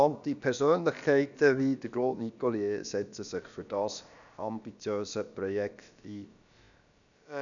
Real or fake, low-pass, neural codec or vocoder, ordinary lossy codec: fake; 7.2 kHz; codec, 16 kHz, about 1 kbps, DyCAST, with the encoder's durations; none